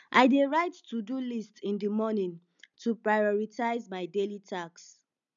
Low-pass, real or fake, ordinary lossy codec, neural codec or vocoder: 7.2 kHz; real; none; none